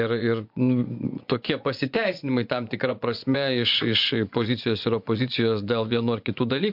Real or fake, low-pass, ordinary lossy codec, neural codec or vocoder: fake; 5.4 kHz; MP3, 48 kbps; vocoder, 24 kHz, 100 mel bands, Vocos